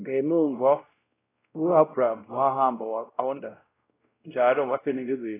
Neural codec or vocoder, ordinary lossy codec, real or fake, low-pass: codec, 16 kHz, 0.5 kbps, X-Codec, WavLM features, trained on Multilingual LibriSpeech; AAC, 16 kbps; fake; 3.6 kHz